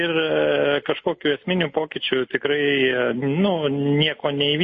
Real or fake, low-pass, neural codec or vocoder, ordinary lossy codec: real; 9.9 kHz; none; MP3, 32 kbps